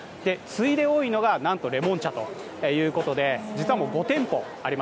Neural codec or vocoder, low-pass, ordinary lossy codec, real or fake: none; none; none; real